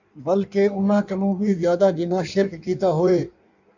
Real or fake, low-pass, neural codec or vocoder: fake; 7.2 kHz; codec, 16 kHz in and 24 kHz out, 1.1 kbps, FireRedTTS-2 codec